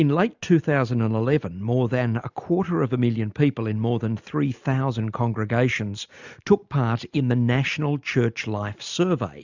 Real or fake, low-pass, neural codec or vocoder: real; 7.2 kHz; none